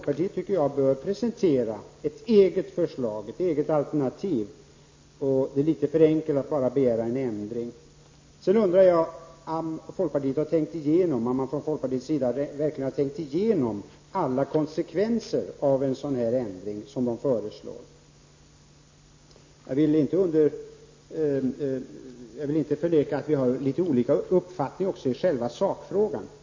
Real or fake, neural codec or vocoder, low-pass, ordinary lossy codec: real; none; 7.2 kHz; MP3, 32 kbps